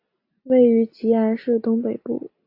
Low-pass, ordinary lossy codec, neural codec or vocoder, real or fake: 5.4 kHz; AAC, 32 kbps; none; real